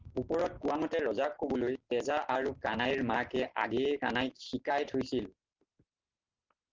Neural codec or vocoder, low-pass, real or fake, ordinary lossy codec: none; 7.2 kHz; real; Opus, 16 kbps